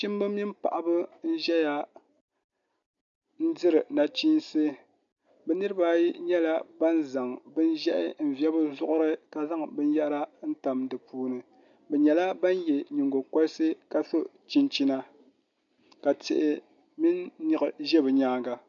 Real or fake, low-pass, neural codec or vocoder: real; 7.2 kHz; none